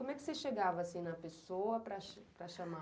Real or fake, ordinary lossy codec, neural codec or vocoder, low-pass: real; none; none; none